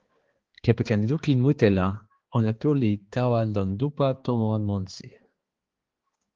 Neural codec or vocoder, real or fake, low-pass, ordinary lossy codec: codec, 16 kHz, 2 kbps, X-Codec, HuBERT features, trained on balanced general audio; fake; 7.2 kHz; Opus, 16 kbps